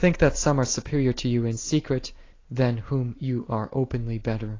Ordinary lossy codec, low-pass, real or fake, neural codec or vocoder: AAC, 32 kbps; 7.2 kHz; real; none